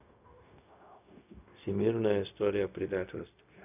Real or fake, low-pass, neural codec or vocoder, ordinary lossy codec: fake; 3.6 kHz; codec, 16 kHz, 0.4 kbps, LongCat-Audio-Codec; none